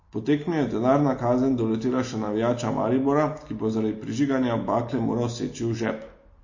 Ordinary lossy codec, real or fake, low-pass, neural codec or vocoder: MP3, 32 kbps; real; 7.2 kHz; none